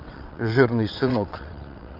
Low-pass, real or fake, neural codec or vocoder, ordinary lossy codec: 5.4 kHz; fake; vocoder, 22.05 kHz, 80 mel bands, WaveNeXt; Opus, 64 kbps